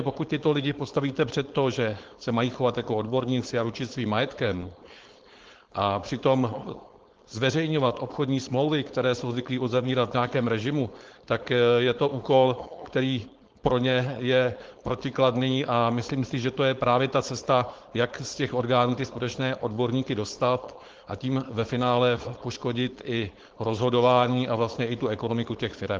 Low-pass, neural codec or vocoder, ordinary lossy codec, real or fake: 7.2 kHz; codec, 16 kHz, 4.8 kbps, FACodec; Opus, 16 kbps; fake